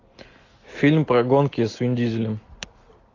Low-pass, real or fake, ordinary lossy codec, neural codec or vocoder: 7.2 kHz; real; AAC, 32 kbps; none